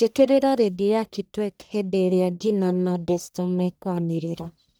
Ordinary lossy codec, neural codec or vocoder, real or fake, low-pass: none; codec, 44.1 kHz, 1.7 kbps, Pupu-Codec; fake; none